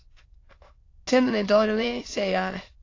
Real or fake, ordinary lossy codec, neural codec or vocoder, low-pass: fake; AAC, 32 kbps; autoencoder, 22.05 kHz, a latent of 192 numbers a frame, VITS, trained on many speakers; 7.2 kHz